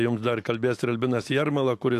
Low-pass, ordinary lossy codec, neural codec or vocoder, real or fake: 14.4 kHz; AAC, 64 kbps; autoencoder, 48 kHz, 128 numbers a frame, DAC-VAE, trained on Japanese speech; fake